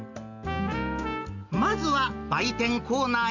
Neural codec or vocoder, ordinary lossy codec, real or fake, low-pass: none; none; real; 7.2 kHz